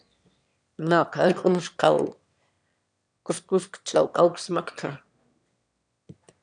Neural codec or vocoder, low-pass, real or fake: autoencoder, 22.05 kHz, a latent of 192 numbers a frame, VITS, trained on one speaker; 9.9 kHz; fake